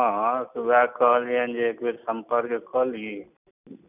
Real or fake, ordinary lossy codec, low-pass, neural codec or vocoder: real; none; 3.6 kHz; none